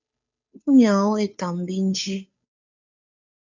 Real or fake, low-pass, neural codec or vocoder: fake; 7.2 kHz; codec, 16 kHz, 2 kbps, FunCodec, trained on Chinese and English, 25 frames a second